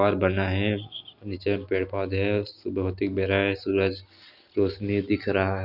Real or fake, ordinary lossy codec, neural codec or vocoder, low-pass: real; none; none; 5.4 kHz